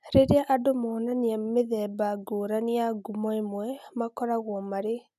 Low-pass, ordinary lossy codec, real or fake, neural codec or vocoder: 14.4 kHz; none; real; none